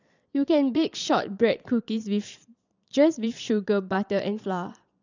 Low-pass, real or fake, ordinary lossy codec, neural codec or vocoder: 7.2 kHz; fake; none; vocoder, 22.05 kHz, 80 mel bands, Vocos